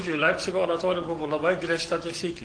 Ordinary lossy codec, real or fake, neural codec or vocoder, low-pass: Opus, 16 kbps; fake; codec, 44.1 kHz, 7.8 kbps, DAC; 9.9 kHz